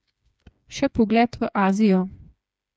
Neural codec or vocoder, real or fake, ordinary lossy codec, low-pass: codec, 16 kHz, 4 kbps, FreqCodec, smaller model; fake; none; none